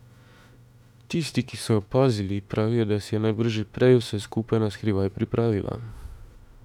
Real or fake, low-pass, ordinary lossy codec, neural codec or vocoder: fake; 19.8 kHz; none; autoencoder, 48 kHz, 32 numbers a frame, DAC-VAE, trained on Japanese speech